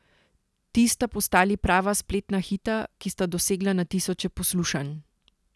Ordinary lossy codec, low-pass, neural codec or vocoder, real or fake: none; none; none; real